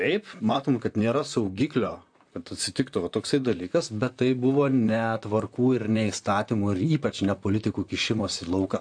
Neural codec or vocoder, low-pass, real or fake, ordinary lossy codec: vocoder, 44.1 kHz, 128 mel bands, Pupu-Vocoder; 9.9 kHz; fake; AAC, 64 kbps